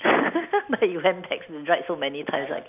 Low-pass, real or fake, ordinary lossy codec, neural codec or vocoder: 3.6 kHz; real; none; none